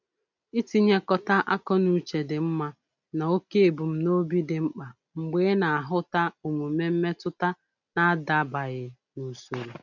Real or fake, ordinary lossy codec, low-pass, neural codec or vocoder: real; none; 7.2 kHz; none